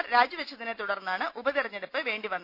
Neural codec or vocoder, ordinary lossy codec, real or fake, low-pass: none; none; real; 5.4 kHz